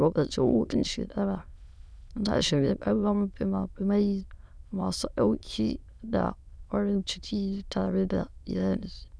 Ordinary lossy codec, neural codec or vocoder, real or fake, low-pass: none; autoencoder, 22.05 kHz, a latent of 192 numbers a frame, VITS, trained on many speakers; fake; none